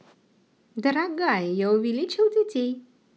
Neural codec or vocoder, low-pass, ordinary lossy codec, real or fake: none; none; none; real